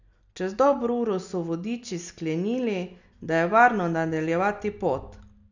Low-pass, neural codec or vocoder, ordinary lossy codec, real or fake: 7.2 kHz; none; none; real